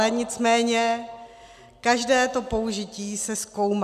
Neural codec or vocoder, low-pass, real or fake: none; 14.4 kHz; real